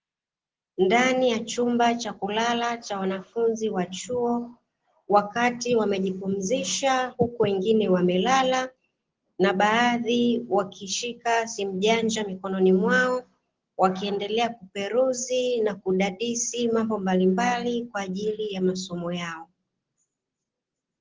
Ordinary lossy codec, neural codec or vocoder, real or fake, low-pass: Opus, 16 kbps; none; real; 7.2 kHz